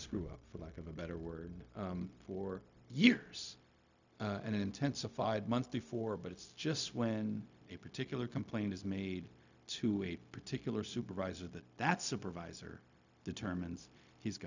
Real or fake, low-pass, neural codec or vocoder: fake; 7.2 kHz; codec, 16 kHz, 0.4 kbps, LongCat-Audio-Codec